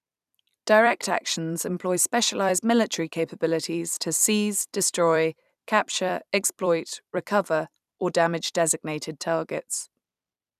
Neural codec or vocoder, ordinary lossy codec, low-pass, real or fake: vocoder, 44.1 kHz, 128 mel bands every 256 samples, BigVGAN v2; none; 14.4 kHz; fake